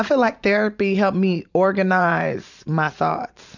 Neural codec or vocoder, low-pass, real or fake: vocoder, 44.1 kHz, 128 mel bands every 512 samples, BigVGAN v2; 7.2 kHz; fake